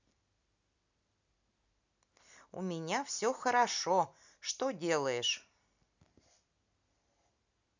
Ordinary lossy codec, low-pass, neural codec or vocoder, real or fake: none; 7.2 kHz; none; real